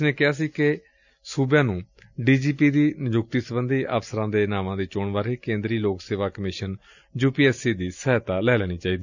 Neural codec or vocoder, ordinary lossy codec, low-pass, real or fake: none; none; 7.2 kHz; real